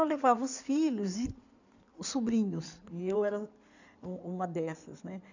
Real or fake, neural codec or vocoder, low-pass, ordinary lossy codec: fake; codec, 16 kHz in and 24 kHz out, 2.2 kbps, FireRedTTS-2 codec; 7.2 kHz; none